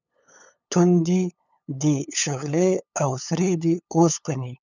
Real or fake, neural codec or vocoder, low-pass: fake; codec, 16 kHz, 8 kbps, FunCodec, trained on LibriTTS, 25 frames a second; 7.2 kHz